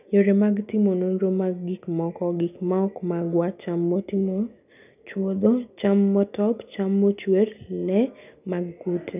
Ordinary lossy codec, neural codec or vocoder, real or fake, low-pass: none; none; real; 3.6 kHz